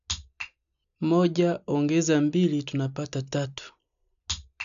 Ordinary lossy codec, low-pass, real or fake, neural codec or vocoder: none; 7.2 kHz; real; none